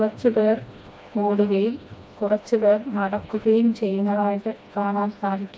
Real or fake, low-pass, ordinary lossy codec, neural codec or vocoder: fake; none; none; codec, 16 kHz, 1 kbps, FreqCodec, smaller model